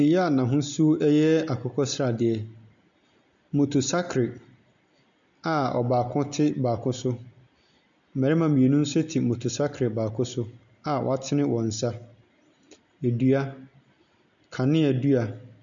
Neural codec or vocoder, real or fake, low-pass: none; real; 7.2 kHz